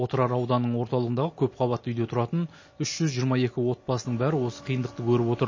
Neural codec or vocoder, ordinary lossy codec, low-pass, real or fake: none; MP3, 32 kbps; 7.2 kHz; real